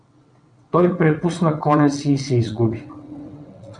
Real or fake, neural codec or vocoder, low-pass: fake; vocoder, 22.05 kHz, 80 mel bands, WaveNeXt; 9.9 kHz